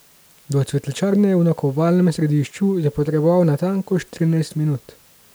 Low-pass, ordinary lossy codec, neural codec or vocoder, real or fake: none; none; none; real